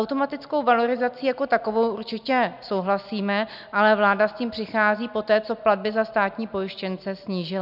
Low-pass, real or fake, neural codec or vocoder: 5.4 kHz; real; none